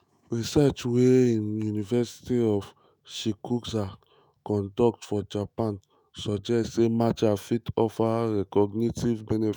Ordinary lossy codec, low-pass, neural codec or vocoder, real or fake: none; none; autoencoder, 48 kHz, 128 numbers a frame, DAC-VAE, trained on Japanese speech; fake